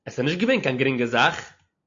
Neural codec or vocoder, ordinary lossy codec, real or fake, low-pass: none; AAC, 48 kbps; real; 7.2 kHz